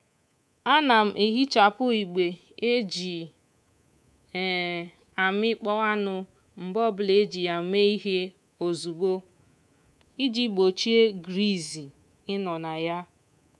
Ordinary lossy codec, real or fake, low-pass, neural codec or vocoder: none; fake; none; codec, 24 kHz, 3.1 kbps, DualCodec